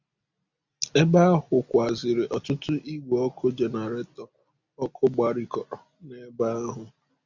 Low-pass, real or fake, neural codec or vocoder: 7.2 kHz; real; none